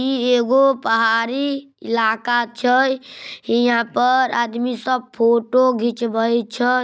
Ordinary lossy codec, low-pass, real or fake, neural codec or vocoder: none; none; real; none